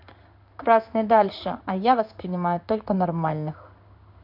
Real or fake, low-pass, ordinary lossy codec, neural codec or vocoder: fake; 5.4 kHz; none; codec, 16 kHz in and 24 kHz out, 1 kbps, XY-Tokenizer